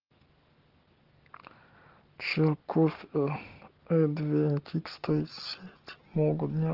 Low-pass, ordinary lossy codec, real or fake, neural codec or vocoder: 5.4 kHz; Opus, 16 kbps; real; none